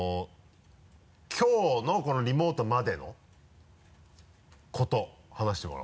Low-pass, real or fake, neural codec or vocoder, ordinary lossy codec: none; real; none; none